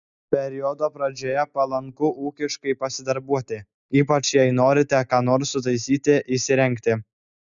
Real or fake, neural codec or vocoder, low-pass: real; none; 7.2 kHz